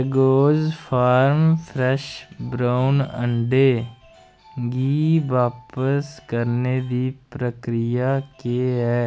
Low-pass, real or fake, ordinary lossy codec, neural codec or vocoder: none; real; none; none